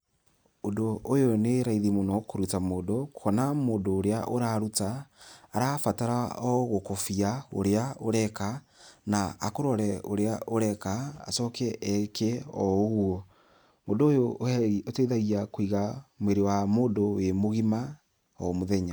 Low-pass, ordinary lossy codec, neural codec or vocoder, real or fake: none; none; none; real